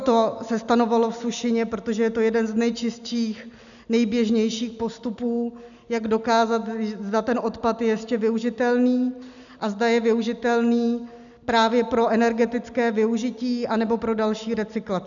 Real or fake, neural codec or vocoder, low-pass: real; none; 7.2 kHz